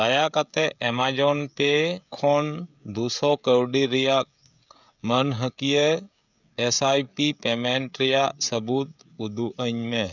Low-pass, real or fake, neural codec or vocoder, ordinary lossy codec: 7.2 kHz; fake; codec, 16 kHz, 16 kbps, FreqCodec, smaller model; none